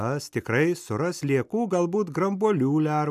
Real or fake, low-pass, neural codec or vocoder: real; 14.4 kHz; none